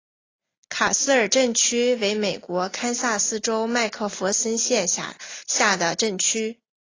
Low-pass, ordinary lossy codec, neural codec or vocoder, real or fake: 7.2 kHz; AAC, 32 kbps; none; real